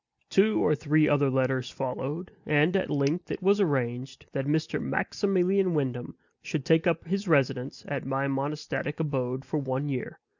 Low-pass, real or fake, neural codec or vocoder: 7.2 kHz; real; none